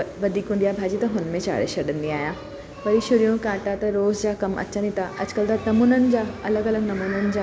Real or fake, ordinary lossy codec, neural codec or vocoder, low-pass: real; none; none; none